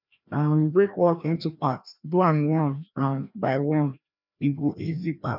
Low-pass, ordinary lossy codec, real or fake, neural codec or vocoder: 5.4 kHz; none; fake; codec, 16 kHz, 1 kbps, FreqCodec, larger model